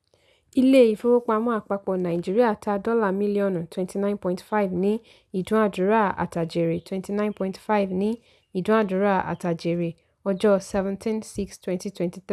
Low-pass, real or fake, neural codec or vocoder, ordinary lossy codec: none; real; none; none